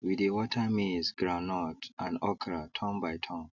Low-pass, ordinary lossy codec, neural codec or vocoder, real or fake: 7.2 kHz; none; none; real